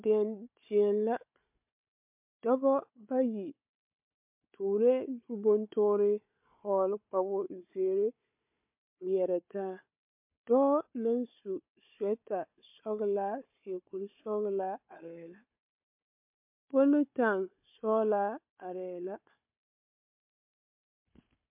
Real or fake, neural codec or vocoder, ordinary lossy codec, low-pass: fake; codec, 16 kHz, 4 kbps, FunCodec, trained on Chinese and English, 50 frames a second; MP3, 32 kbps; 3.6 kHz